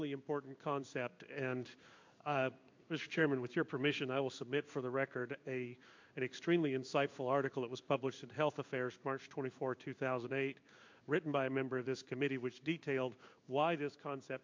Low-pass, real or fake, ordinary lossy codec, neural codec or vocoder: 7.2 kHz; fake; MP3, 48 kbps; codec, 16 kHz in and 24 kHz out, 1 kbps, XY-Tokenizer